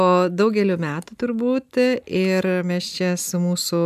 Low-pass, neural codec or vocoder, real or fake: 14.4 kHz; none; real